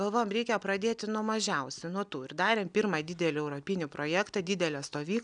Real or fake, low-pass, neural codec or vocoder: real; 9.9 kHz; none